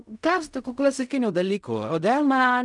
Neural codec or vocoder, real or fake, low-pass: codec, 16 kHz in and 24 kHz out, 0.4 kbps, LongCat-Audio-Codec, fine tuned four codebook decoder; fake; 10.8 kHz